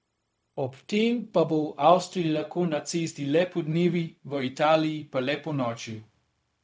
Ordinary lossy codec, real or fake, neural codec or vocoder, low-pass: none; fake; codec, 16 kHz, 0.4 kbps, LongCat-Audio-Codec; none